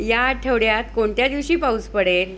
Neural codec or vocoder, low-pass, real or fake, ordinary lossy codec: none; none; real; none